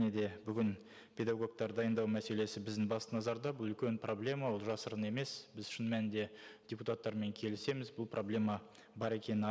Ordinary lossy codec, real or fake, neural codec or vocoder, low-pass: none; real; none; none